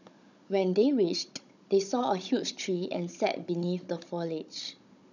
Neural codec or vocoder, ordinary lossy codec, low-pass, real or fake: codec, 16 kHz, 16 kbps, FunCodec, trained on Chinese and English, 50 frames a second; none; 7.2 kHz; fake